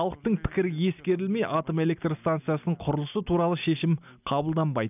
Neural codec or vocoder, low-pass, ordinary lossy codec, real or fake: none; 3.6 kHz; none; real